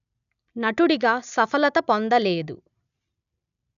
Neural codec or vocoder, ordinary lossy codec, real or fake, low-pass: none; none; real; 7.2 kHz